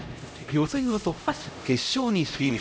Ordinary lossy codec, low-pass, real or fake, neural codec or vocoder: none; none; fake; codec, 16 kHz, 0.5 kbps, X-Codec, HuBERT features, trained on LibriSpeech